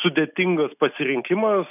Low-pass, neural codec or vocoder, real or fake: 3.6 kHz; none; real